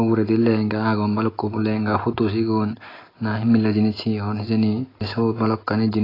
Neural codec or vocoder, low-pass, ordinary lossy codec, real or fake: none; 5.4 kHz; AAC, 24 kbps; real